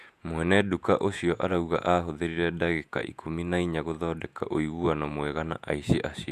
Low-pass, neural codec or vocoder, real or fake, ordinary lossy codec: 14.4 kHz; vocoder, 48 kHz, 128 mel bands, Vocos; fake; none